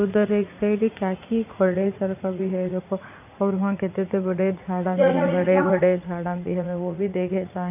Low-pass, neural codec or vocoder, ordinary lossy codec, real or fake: 3.6 kHz; vocoder, 44.1 kHz, 80 mel bands, Vocos; none; fake